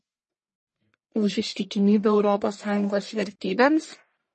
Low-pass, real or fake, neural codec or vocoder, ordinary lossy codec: 10.8 kHz; fake; codec, 44.1 kHz, 1.7 kbps, Pupu-Codec; MP3, 32 kbps